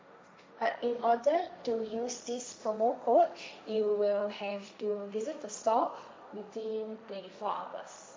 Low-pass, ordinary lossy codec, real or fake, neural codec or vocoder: none; none; fake; codec, 16 kHz, 1.1 kbps, Voila-Tokenizer